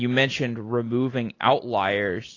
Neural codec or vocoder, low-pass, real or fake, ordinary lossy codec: none; 7.2 kHz; real; AAC, 32 kbps